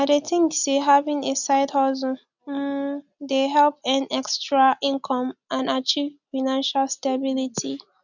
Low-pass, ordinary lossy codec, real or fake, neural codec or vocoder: 7.2 kHz; none; real; none